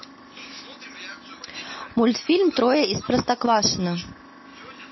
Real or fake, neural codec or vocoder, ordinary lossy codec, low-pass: real; none; MP3, 24 kbps; 7.2 kHz